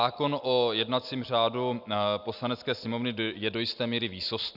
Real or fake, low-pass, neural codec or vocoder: real; 5.4 kHz; none